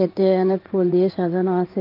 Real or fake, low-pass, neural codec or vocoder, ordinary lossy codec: fake; 5.4 kHz; codec, 16 kHz in and 24 kHz out, 1 kbps, XY-Tokenizer; Opus, 32 kbps